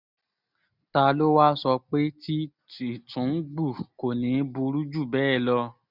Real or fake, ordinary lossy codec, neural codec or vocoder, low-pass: real; none; none; 5.4 kHz